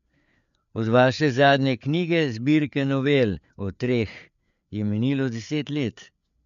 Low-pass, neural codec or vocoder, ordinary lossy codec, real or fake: 7.2 kHz; codec, 16 kHz, 4 kbps, FreqCodec, larger model; none; fake